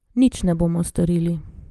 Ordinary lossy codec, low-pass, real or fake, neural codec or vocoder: Opus, 32 kbps; 14.4 kHz; fake; vocoder, 44.1 kHz, 128 mel bands, Pupu-Vocoder